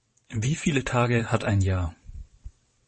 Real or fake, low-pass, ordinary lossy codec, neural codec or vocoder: fake; 9.9 kHz; MP3, 32 kbps; vocoder, 22.05 kHz, 80 mel bands, WaveNeXt